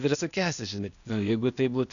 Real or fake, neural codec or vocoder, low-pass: fake; codec, 16 kHz, 0.8 kbps, ZipCodec; 7.2 kHz